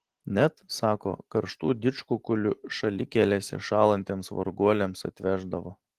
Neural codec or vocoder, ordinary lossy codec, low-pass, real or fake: none; Opus, 16 kbps; 14.4 kHz; real